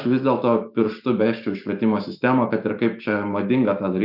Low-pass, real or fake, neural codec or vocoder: 5.4 kHz; fake; autoencoder, 48 kHz, 128 numbers a frame, DAC-VAE, trained on Japanese speech